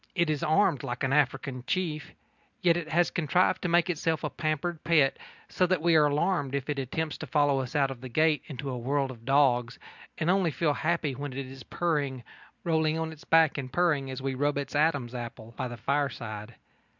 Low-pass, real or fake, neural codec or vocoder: 7.2 kHz; real; none